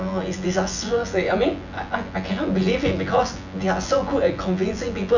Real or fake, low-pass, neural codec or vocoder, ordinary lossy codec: fake; 7.2 kHz; vocoder, 24 kHz, 100 mel bands, Vocos; none